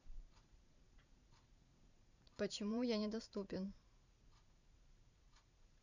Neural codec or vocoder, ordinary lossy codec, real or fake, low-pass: vocoder, 44.1 kHz, 80 mel bands, Vocos; none; fake; 7.2 kHz